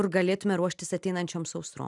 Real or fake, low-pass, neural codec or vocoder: real; 10.8 kHz; none